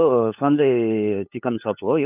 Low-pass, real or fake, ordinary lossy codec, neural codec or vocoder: 3.6 kHz; fake; none; codec, 16 kHz, 8 kbps, FunCodec, trained on LibriTTS, 25 frames a second